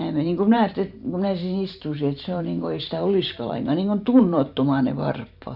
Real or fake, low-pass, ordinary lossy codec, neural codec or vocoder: fake; 5.4 kHz; none; vocoder, 44.1 kHz, 128 mel bands every 512 samples, BigVGAN v2